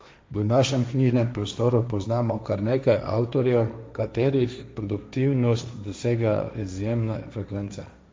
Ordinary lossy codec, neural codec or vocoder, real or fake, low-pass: none; codec, 16 kHz, 1.1 kbps, Voila-Tokenizer; fake; none